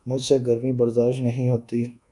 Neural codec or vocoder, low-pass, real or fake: codec, 24 kHz, 1.2 kbps, DualCodec; 10.8 kHz; fake